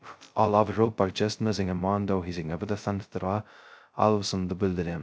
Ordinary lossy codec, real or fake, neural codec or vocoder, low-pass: none; fake; codec, 16 kHz, 0.2 kbps, FocalCodec; none